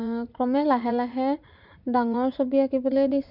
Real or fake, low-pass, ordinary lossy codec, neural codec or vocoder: fake; 5.4 kHz; none; vocoder, 44.1 kHz, 80 mel bands, Vocos